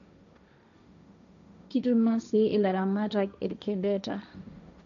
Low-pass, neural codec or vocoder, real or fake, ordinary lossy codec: 7.2 kHz; codec, 16 kHz, 1.1 kbps, Voila-Tokenizer; fake; none